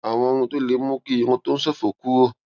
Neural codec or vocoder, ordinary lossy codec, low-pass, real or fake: none; none; 7.2 kHz; real